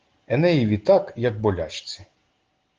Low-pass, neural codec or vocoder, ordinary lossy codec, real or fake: 7.2 kHz; none; Opus, 16 kbps; real